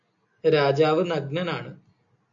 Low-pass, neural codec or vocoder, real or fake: 7.2 kHz; none; real